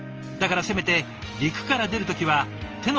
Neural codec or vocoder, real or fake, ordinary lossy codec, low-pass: none; real; Opus, 24 kbps; 7.2 kHz